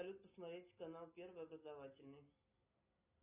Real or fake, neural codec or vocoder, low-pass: real; none; 3.6 kHz